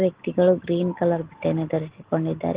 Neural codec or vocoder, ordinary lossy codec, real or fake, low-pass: none; Opus, 16 kbps; real; 3.6 kHz